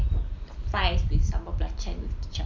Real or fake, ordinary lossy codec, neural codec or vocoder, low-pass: real; none; none; 7.2 kHz